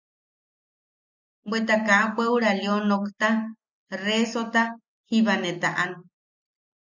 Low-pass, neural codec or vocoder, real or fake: 7.2 kHz; none; real